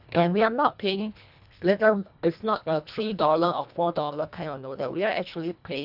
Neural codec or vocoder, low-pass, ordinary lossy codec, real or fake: codec, 24 kHz, 1.5 kbps, HILCodec; 5.4 kHz; none; fake